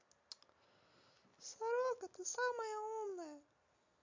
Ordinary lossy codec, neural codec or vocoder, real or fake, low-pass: MP3, 48 kbps; none; real; 7.2 kHz